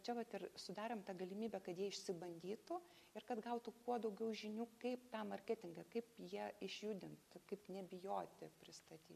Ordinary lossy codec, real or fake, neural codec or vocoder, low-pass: MP3, 96 kbps; real; none; 14.4 kHz